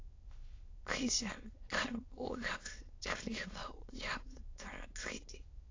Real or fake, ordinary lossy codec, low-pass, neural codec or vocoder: fake; AAC, 32 kbps; 7.2 kHz; autoencoder, 22.05 kHz, a latent of 192 numbers a frame, VITS, trained on many speakers